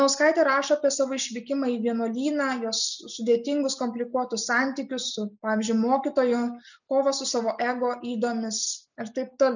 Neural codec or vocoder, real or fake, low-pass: none; real; 7.2 kHz